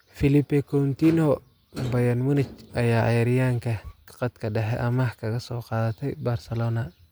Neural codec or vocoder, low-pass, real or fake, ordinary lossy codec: none; none; real; none